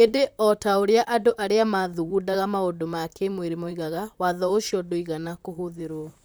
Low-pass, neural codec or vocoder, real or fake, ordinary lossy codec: none; vocoder, 44.1 kHz, 128 mel bands, Pupu-Vocoder; fake; none